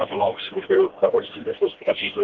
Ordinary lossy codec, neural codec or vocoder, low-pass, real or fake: Opus, 16 kbps; codec, 16 kHz, 1 kbps, FreqCodec, smaller model; 7.2 kHz; fake